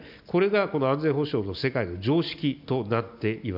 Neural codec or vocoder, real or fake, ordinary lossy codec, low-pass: none; real; none; 5.4 kHz